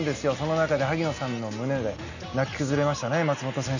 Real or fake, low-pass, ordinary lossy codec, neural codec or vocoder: real; 7.2 kHz; none; none